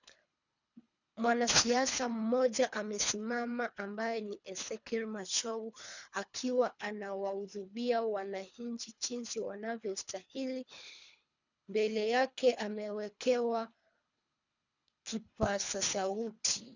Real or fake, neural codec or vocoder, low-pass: fake; codec, 24 kHz, 3 kbps, HILCodec; 7.2 kHz